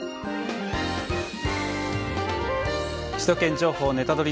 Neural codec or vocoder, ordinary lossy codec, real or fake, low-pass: none; none; real; none